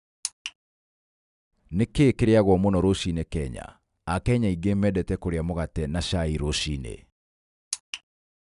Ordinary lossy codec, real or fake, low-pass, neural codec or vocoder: none; real; 10.8 kHz; none